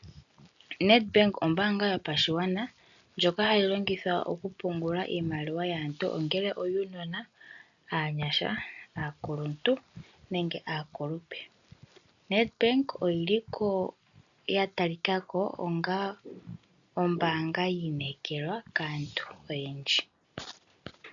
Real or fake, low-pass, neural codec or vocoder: real; 7.2 kHz; none